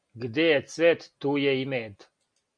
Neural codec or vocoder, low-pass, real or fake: none; 9.9 kHz; real